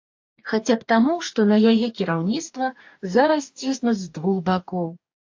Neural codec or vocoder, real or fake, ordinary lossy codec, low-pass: codec, 44.1 kHz, 2.6 kbps, DAC; fake; AAC, 48 kbps; 7.2 kHz